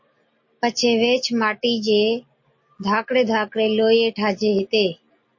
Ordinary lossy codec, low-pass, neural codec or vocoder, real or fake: MP3, 32 kbps; 7.2 kHz; none; real